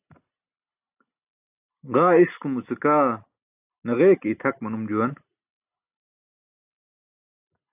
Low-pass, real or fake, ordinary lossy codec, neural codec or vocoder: 3.6 kHz; real; AAC, 32 kbps; none